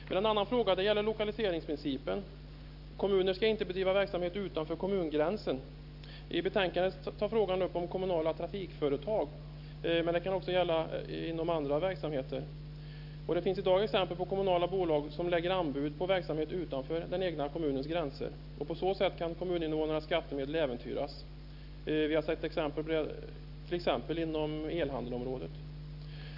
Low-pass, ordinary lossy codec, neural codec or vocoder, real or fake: 5.4 kHz; none; none; real